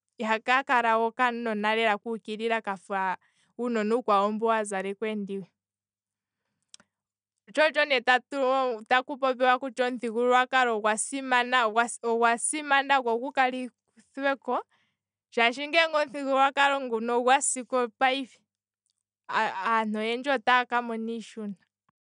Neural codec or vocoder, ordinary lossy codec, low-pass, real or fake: none; none; 9.9 kHz; real